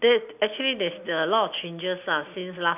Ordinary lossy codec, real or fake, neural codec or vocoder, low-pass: none; real; none; 3.6 kHz